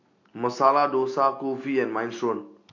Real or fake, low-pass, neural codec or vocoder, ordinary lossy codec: real; 7.2 kHz; none; AAC, 48 kbps